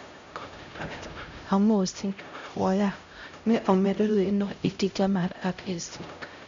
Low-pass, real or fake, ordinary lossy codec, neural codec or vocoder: 7.2 kHz; fake; MP3, 64 kbps; codec, 16 kHz, 0.5 kbps, X-Codec, HuBERT features, trained on LibriSpeech